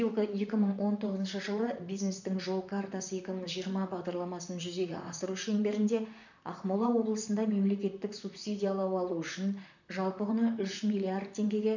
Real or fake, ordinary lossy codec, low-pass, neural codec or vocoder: fake; none; 7.2 kHz; vocoder, 44.1 kHz, 128 mel bands, Pupu-Vocoder